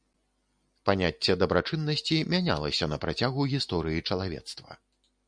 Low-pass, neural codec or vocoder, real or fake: 9.9 kHz; none; real